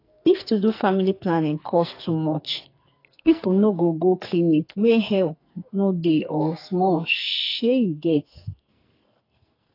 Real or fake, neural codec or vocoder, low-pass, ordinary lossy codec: fake; codec, 44.1 kHz, 2.6 kbps, SNAC; 5.4 kHz; AAC, 32 kbps